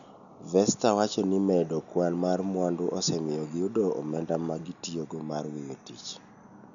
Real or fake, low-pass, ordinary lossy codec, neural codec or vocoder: real; 7.2 kHz; none; none